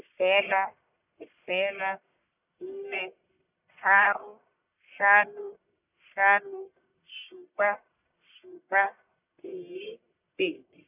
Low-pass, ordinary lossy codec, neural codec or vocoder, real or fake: 3.6 kHz; none; codec, 44.1 kHz, 1.7 kbps, Pupu-Codec; fake